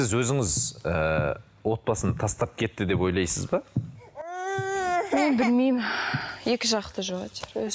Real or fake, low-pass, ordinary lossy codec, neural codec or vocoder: real; none; none; none